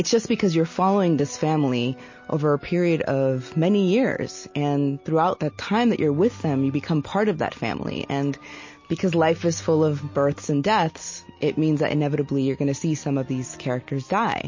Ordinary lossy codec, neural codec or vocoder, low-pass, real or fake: MP3, 32 kbps; none; 7.2 kHz; real